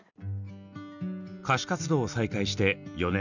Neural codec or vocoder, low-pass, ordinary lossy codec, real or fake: none; 7.2 kHz; none; real